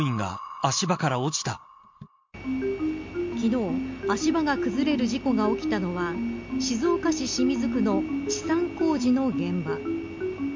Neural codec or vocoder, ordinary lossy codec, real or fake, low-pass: none; MP3, 48 kbps; real; 7.2 kHz